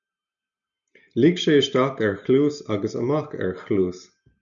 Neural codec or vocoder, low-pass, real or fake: none; 7.2 kHz; real